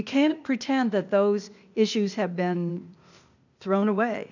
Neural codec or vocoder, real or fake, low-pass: codec, 16 kHz, 0.8 kbps, ZipCodec; fake; 7.2 kHz